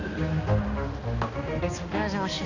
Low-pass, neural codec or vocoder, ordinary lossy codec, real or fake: 7.2 kHz; codec, 16 kHz, 2 kbps, X-Codec, HuBERT features, trained on balanced general audio; none; fake